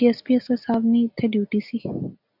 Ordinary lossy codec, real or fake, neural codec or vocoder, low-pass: AAC, 48 kbps; real; none; 5.4 kHz